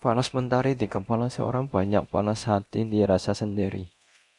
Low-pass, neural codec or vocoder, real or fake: 10.8 kHz; codec, 24 kHz, 0.9 kbps, DualCodec; fake